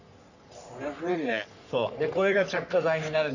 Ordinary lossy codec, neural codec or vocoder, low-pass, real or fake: none; codec, 44.1 kHz, 3.4 kbps, Pupu-Codec; 7.2 kHz; fake